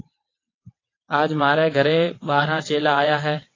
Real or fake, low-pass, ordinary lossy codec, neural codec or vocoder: fake; 7.2 kHz; AAC, 32 kbps; vocoder, 22.05 kHz, 80 mel bands, WaveNeXt